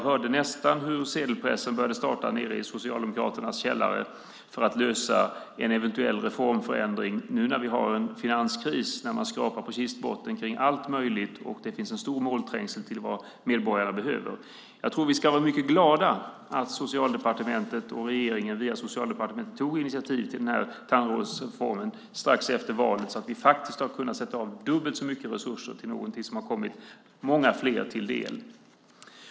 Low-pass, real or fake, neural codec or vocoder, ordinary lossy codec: none; real; none; none